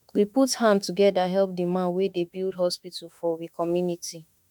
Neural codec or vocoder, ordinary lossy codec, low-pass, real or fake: autoencoder, 48 kHz, 32 numbers a frame, DAC-VAE, trained on Japanese speech; none; 19.8 kHz; fake